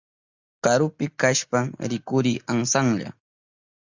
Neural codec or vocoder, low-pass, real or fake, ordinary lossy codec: none; 7.2 kHz; real; Opus, 64 kbps